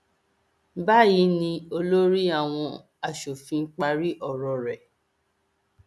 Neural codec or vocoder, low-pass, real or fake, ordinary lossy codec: none; none; real; none